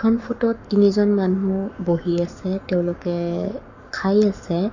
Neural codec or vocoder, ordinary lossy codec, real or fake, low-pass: codec, 44.1 kHz, 7.8 kbps, Pupu-Codec; none; fake; 7.2 kHz